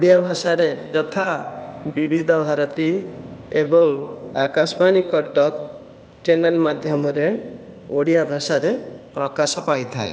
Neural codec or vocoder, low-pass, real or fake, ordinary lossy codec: codec, 16 kHz, 0.8 kbps, ZipCodec; none; fake; none